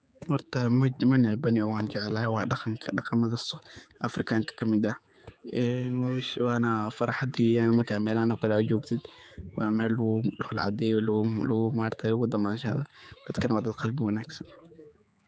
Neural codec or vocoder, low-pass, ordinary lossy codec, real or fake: codec, 16 kHz, 4 kbps, X-Codec, HuBERT features, trained on general audio; none; none; fake